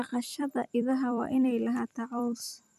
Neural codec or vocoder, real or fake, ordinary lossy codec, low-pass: vocoder, 44.1 kHz, 128 mel bands every 256 samples, BigVGAN v2; fake; none; 14.4 kHz